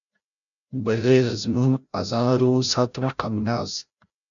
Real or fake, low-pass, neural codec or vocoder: fake; 7.2 kHz; codec, 16 kHz, 0.5 kbps, FreqCodec, larger model